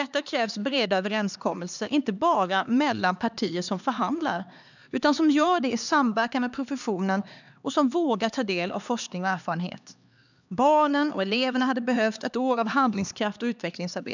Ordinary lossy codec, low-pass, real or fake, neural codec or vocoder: none; 7.2 kHz; fake; codec, 16 kHz, 2 kbps, X-Codec, HuBERT features, trained on LibriSpeech